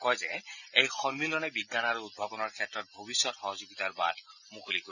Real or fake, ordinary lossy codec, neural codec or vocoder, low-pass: real; none; none; 7.2 kHz